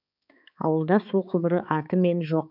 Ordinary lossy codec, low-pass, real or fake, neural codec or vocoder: none; 5.4 kHz; fake; codec, 16 kHz, 4 kbps, X-Codec, HuBERT features, trained on balanced general audio